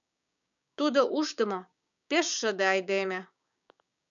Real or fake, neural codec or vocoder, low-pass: fake; codec, 16 kHz, 6 kbps, DAC; 7.2 kHz